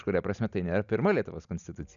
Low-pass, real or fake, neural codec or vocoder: 7.2 kHz; real; none